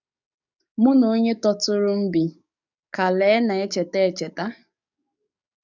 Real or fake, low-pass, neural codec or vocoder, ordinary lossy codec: fake; 7.2 kHz; codec, 44.1 kHz, 7.8 kbps, DAC; none